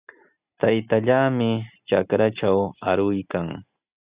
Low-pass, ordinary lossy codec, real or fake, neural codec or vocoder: 3.6 kHz; Opus, 64 kbps; real; none